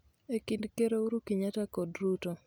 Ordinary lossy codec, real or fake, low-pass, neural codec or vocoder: none; real; none; none